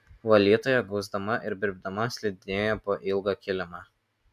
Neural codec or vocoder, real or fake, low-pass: none; real; 14.4 kHz